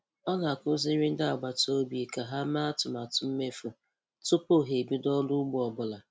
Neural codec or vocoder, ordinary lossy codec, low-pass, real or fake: none; none; none; real